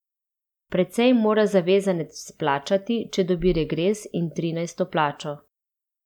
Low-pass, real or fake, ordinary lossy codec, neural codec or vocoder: 19.8 kHz; real; none; none